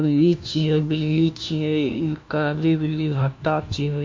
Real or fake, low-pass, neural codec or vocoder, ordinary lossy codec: fake; 7.2 kHz; codec, 16 kHz, 1 kbps, FunCodec, trained on LibriTTS, 50 frames a second; MP3, 48 kbps